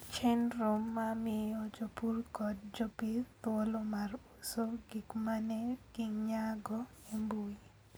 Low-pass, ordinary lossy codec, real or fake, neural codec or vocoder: none; none; real; none